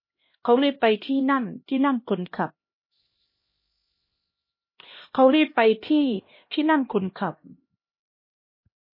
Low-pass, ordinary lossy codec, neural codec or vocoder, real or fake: 5.4 kHz; MP3, 24 kbps; codec, 16 kHz, 1 kbps, X-Codec, HuBERT features, trained on LibriSpeech; fake